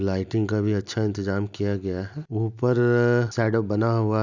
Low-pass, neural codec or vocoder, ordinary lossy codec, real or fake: 7.2 kHz; none; none; real